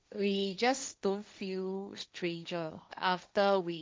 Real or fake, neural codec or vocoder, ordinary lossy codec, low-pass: fake; codec, 16 kHz, 1.1 kbps, Voila-Tokenizer; none; none